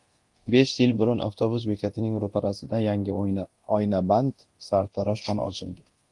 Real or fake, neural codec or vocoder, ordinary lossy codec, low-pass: fake; codec, 24 kHz, 0.9 kbps, DualCodec; Opus, 24 kbps; 10.8 kHz